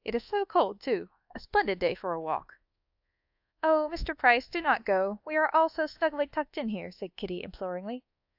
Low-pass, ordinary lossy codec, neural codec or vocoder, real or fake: 5.4 kHz; AAC, 48 kbps; codec, 24 kHz, 1.2 kbps, DualCodec; fake